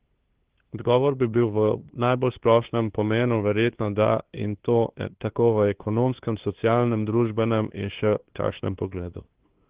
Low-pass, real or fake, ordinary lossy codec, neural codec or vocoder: 3.6 kHz; fake; Opus, 16 kbps; codec, 16 kHz, 2 kbps, FunCodec, trained on LibriTTS, 25 frames a second